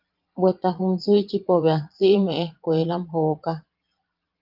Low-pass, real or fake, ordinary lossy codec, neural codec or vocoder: 5.4 kHz; fake; Opus, 24 kbps; vocoder, 22.05 kHz, 80 mel bands, Vocos